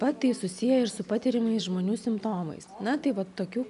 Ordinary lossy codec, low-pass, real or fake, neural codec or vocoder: MP3, 96 kbps; 10.8 kHz; real; none